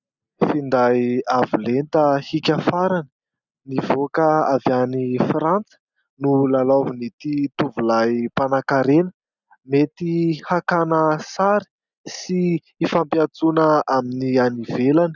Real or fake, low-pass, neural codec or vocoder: real; 7.2 kHz; none